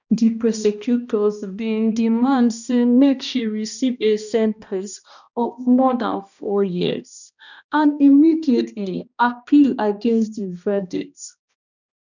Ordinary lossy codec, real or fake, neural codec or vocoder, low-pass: none; fake; codec, 16 kHz, 1 kbps, X-Codec, HuBERT features, trained on balanced general audio; 7.2 kHz